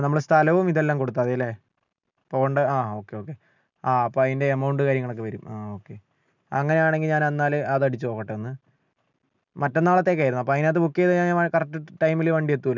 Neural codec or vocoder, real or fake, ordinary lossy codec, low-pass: none; real; none; 7.2 kHz